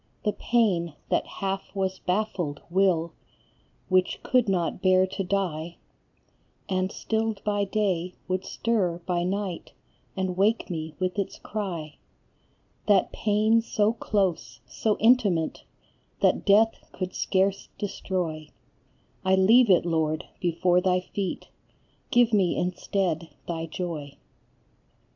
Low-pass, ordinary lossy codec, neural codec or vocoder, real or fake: 7.2 kHz; AAC, 48 kbps; none; real